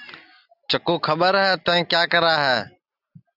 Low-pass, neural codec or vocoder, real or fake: 5.4 kHz; none; real